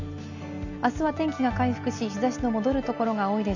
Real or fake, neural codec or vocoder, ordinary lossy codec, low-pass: real; none; none; 7.2 kHz